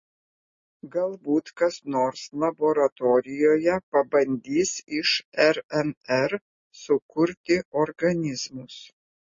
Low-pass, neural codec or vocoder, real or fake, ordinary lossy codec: 7.2 kHz; none; real; MP3, 32 kbps